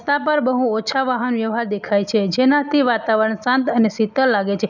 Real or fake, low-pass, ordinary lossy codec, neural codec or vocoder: real; 7.2 kHz; none; none